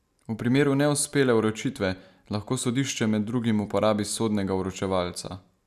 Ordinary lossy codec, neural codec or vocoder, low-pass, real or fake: none; none; 14.4 kHz; real